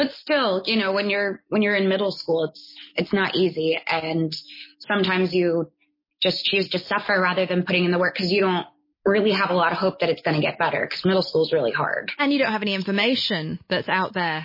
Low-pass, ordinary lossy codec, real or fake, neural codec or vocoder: 5.4 kHz; MP3, 24 kbps; real; none